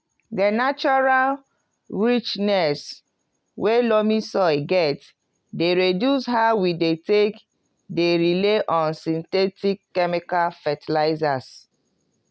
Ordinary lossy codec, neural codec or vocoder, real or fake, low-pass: none; none; real; none